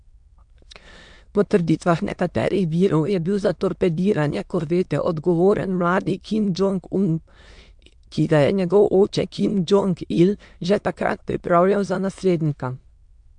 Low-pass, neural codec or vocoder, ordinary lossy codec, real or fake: 9.9 kHz; autoencoder, 22.05 kHz, a latent of 192 numbers a frame, VITS, trained on many speakers; MP3, 64 kbps; fake